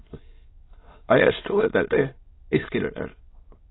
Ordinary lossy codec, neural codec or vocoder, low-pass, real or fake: AAC, 16 kbps; autoencoder, 22.05 kHz, a latent of 192 numbers a frame, VITS, trained on many speakers; 7.2 kHz; fake